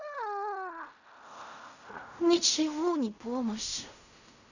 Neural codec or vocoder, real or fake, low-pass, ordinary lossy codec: codec, 16 kHz in and 24 kHz out, 0.4 kbps, LongCat-Audio-Codec, fine tuned four codebook decoder; fake; 7.2 kHz; none